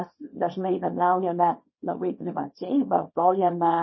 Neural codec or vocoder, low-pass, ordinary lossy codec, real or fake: codec, 24 kHz, 0.9 kbps, WavTokenizer, small release; 7.2 kHz; MP3, 24 kbps; fake